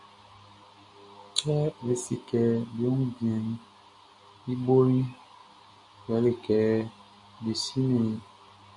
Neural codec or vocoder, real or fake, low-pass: none; real; 10.8 kHz